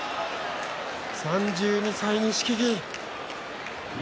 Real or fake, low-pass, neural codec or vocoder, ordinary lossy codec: real; none; none; none